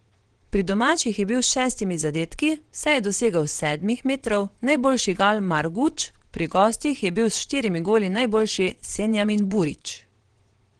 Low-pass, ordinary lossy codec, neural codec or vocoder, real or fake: 9.9 kHz; Opus, 16 kbps; vocoder, 22.05 kHz, 80 mel bands, WaveNeXt; fake